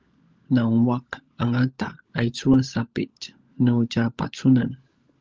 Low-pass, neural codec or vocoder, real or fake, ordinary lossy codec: 7.2 kHz; codec, 16 kHz, 16 kbps, FunCodec, trained on LibriTTS, 50 frames a second; fake; Opus, 24 kbps